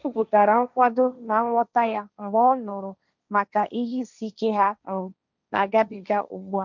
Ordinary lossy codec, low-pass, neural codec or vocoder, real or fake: none; 7.2 kHz; codec, 16 kHz, 1.1 kbps, Voila-Tokenizer; fake